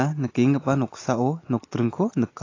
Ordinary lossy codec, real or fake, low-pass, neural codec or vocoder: AAC, 32 kbps; real; 7.2 kHz; none